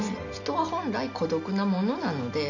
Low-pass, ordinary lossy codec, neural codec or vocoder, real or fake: 7.2 kHz; none; none; real